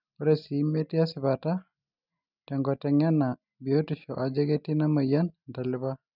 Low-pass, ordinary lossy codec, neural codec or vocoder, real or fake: 5.4 kHz; none; none; real